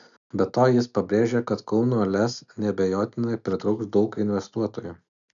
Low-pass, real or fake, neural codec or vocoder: 7.2 kHz; real; none